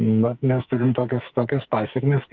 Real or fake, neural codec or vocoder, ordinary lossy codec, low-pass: fake; codec, 44.1 kHz, 2.6 kbps, SNAC; Opus, 24 kbps; 7.2 kHz